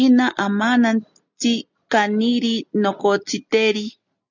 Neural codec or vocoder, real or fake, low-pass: none; real; 7.2 kHz